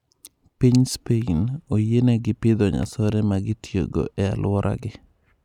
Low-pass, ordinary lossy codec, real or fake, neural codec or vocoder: 19.8 kHz; none; real; none